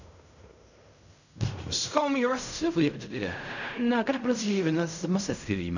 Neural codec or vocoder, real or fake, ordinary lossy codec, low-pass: codec, 16 kHz in and 24 kHz out, 0.4 kbps, LongCat-Audio-Codec, fine tuned four codebook decoder; fake; none; 7.2 kHz